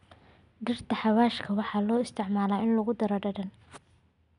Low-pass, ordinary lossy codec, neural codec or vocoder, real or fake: 10.8 kHz; none; none; real